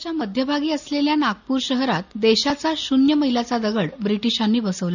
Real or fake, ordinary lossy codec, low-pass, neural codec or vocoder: real; none; 7.2 kHz; none